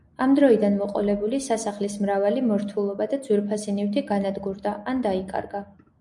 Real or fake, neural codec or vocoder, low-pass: real; none; 10.8 kHz